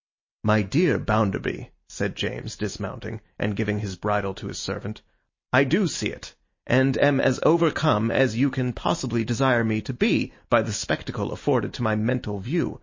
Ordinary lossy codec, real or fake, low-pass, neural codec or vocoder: MP3, 32 kbps; real; 7.2 kHz; none